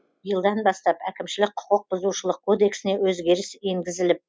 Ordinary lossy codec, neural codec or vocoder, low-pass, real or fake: none; none; none; real